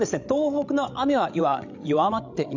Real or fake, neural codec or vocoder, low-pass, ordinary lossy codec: fake; codec, 16 kHz, 16 kbps, FreqCodec, larger model; 7.2 kHz; none